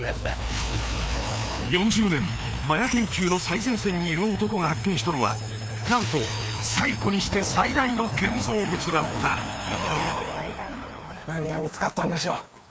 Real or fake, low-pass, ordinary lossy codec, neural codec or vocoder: fake; none; none; codec, 16 kHz, 2 kbps, FreqCodec, larger model